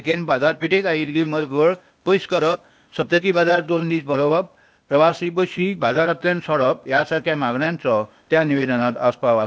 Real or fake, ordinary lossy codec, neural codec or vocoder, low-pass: fake; none; codec, 16 kHz, 0.8 kbps, ZipCodec; none